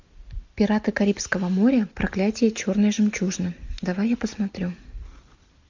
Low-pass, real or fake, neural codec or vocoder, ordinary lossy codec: 7.2 kHz; real; none; MP3, 64 kbps